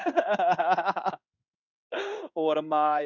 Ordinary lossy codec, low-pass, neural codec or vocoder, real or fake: none; 7.2 kHz; codec, 16 kHz in and 24 kHz out, 1 kbps, XY-Tokenizer; fake